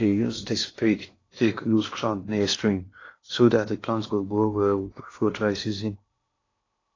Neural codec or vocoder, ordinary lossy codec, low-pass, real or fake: codec, 16 kHz in and 24 kHz out, 0.6 kbps, FocalCodec, streaming, 2048 codes; AAC, 32 kbps; 7.2 kHz; fake